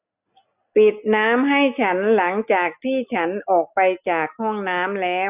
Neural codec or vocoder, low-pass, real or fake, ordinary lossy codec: none; 3.6 kHz; real; none